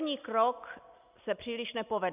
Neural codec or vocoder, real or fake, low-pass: none; real; 3.6 kHz